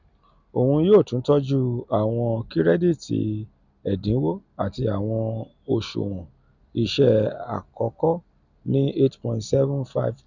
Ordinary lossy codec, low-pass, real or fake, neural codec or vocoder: none; 7.2 kHz; real; none